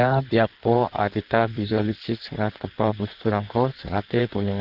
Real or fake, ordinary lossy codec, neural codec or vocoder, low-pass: fake; Opus, 16 kbps; codec, 16 kHz in and 24 kHz out, 1.1 kbps, FireRedTTS-2 codec; 5.4 kHz